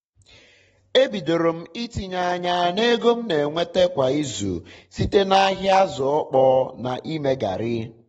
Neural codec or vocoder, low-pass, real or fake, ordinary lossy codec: none; 19.8 kHz; real; AAC, 24 kbps